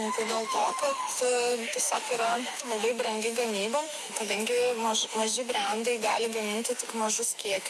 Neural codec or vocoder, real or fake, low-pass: autoencoder, 48 kHz, 32 numbers a frame, DAC-VAE, trained on Japanese speech; fake; 14.4 kHz